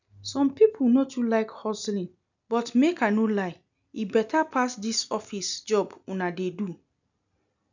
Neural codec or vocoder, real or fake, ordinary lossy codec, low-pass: none; real; none; 7.2 kHz